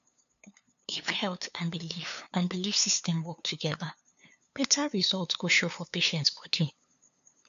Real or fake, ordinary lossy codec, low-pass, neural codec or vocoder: fake; AAC, 96 kbps; 7.2 kHz; codec, 16 kHz, 2 kbps, FunCodec, trained on LibriTTS, 25 frames a second